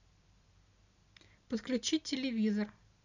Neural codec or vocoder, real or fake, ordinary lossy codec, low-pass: none; real; none; 7.2 kHz